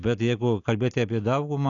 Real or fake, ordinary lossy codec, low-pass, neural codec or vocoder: real; AAC, 48 kbps; 7.2 kHz; none